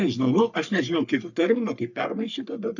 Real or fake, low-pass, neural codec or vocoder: fake; 7.2 kHz; codec, 44.1 kHz, 3.4 kbps, Pupu-Codec